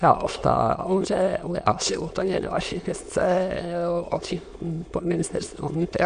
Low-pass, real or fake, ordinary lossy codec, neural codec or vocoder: 9.9 kHz; fake; MP3, 64 kbps; autoencoder, 22.05 kHz, a latent of 192 numbers a frame, VITS, trained on many speakers